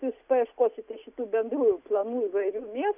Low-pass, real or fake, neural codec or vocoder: 3.6 kHz; real; none